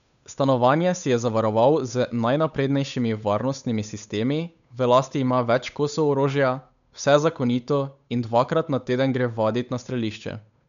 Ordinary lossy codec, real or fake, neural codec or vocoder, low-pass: none; fake; codec, 16 kHz, 8 kbps, FunCodec, trained on Chinese and English, 25 frames a second; 7.2 kHz